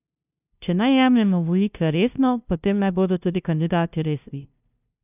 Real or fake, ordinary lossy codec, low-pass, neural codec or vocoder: fake; none; 3.6 kHz; codec, 16 kHz, 0.5 kbps, FunCodec, trained on LibriTTS, 25 frames a second